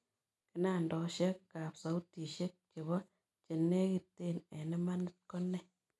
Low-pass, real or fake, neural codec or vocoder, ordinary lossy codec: none; real; none; none